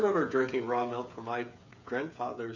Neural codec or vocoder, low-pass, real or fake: codec, 16 kHz, 8 kbps, FreqCodec, smaller model; 7.2 kHz; fake